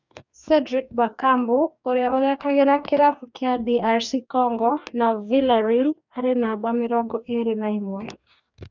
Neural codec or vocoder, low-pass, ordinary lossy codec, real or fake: codec, 44.1 kHz, 2.6 kbps, DAC; 7.2 kHz; none; fake